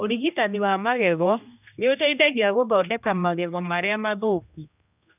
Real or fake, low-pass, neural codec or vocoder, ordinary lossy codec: fake; 3.6 kHz; codec, 16 kHz, 1 kbps, X-Codec, HuBERT features, trained on general audio; none